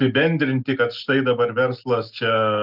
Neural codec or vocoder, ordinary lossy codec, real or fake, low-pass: none; Opus, 24 kbps; real; 5.4 kHz